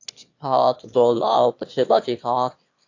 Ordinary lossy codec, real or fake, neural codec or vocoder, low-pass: AAC, 48 kbps; fake; autoencoder, 22.05 kHz, a latent of 192 numbers a frame, VITS, trained on one speaker; 7.2 kHz